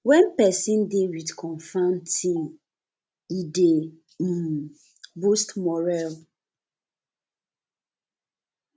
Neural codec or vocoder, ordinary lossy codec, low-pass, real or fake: none; none; none; real